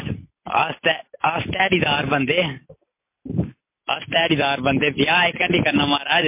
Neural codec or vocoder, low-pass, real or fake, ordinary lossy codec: none; 3.6 kHz; real; MP3, 24 kbps